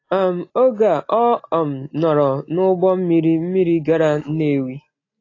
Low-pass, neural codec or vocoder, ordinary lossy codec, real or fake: 7.2 kHz; none; AAC, 32 kbps; real